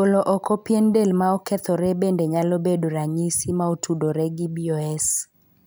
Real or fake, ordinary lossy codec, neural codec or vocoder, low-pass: real; none; none; none